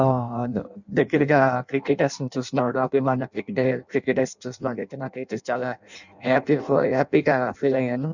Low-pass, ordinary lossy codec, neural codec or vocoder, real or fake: 7.2 kHz; none; codec, 16 kHz in and 24 kHz out, 0.6 kbps, FireRedTTS-2 codec; fake